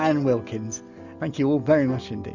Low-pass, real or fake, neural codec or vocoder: 7.2 kHz; real; none